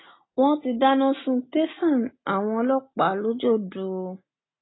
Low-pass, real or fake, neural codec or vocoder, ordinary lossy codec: 7.2 kHz; real; none; AAC, 16 kbps